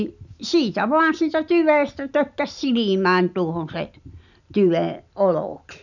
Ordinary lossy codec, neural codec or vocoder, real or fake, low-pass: none; none; real; 7.2 kHz